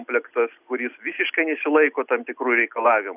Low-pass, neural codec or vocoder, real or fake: 3.6 kHz; none; real